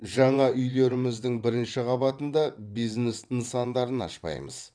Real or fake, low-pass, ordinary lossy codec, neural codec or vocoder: fake; 9.9 kHz; none; vocoder, 22.05 kHz, 80 mel bands, WaveNeXt